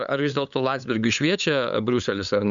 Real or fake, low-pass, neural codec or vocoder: fake; 7.2 kHz; codec, 16 kHz, 8 kbps, FunCodec, trained on LibriTTS, 25 frames a second